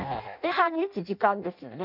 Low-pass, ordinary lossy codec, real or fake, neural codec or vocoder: 5.4 kHz; none; fake; codec, 16 kHz in and 24 kHz out, 0.6 kbps, FireRedTTS-2 codec